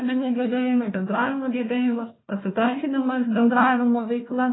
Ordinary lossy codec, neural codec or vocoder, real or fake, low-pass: AAC, 16 kbps; codec, 16 kHz, 1 kbps, FunCodec, trained on Chinese and English, 50 frames a second; fake; 7.2 kHz